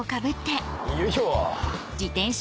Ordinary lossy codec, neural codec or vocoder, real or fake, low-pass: none; none; real; none